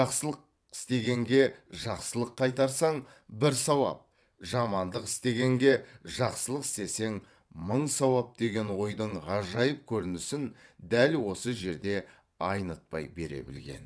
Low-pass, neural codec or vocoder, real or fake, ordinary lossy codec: none; vocoder, 22.05 kHz, 80 mel bands, WaveNeXt; fake; none